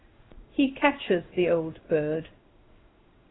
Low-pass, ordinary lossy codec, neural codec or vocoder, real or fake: 7.2 kHz; AAC, 16 kbps; codec, 16 kHz in and 24 kHz out, 1 kbps, XY-Tokenizer; fake